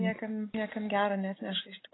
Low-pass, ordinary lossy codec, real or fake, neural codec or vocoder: 7.2 kHz; AAC, 16 kbps; real; none